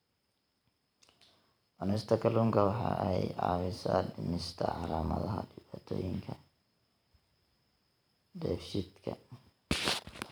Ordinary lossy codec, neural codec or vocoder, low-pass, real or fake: none; vocoder, 44.1 kHz, 128 mel bands every 512 samples, BigVGAN v2; none; fake